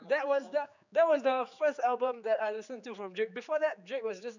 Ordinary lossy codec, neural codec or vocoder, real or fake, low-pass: none; codec, 16 kHz, 4 kbps, X-Codec, HuBERT features, trained on general audio; fake; 7.2 kHz